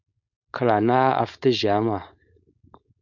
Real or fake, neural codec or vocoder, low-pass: fake; codec, 16 kHz, 4.8 kbps, FACodec; 7.2 kHz